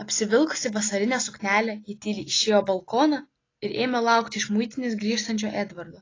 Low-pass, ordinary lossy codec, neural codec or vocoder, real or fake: 7.2 kHz; AAC, 32 kbps; none; real